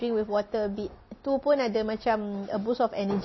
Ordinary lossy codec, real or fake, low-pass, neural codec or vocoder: MP3, 24 kbps; real; 7.2 kHz; none